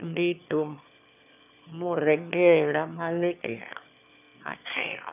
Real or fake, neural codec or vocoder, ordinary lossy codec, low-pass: fake; autoencoder, 22.05 kHz, a latent of 192 numbers a frame, VITS, trained on one speaker; none; 3.6 kHz